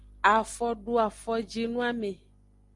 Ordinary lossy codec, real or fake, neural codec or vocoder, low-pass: Opus, 32 kbps; real; none; 10.8 kHz